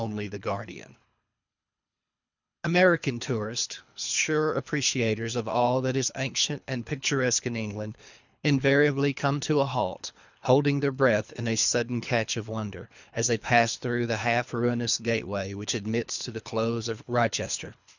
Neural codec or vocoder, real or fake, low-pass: codec, 24 kHz, 3 kbps, HILCodec; fake; 7.2 kHz